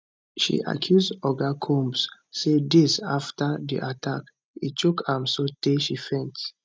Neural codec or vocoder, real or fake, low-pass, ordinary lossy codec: none; real; none; none